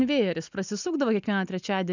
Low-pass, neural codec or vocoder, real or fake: 7.2 kHz; none; real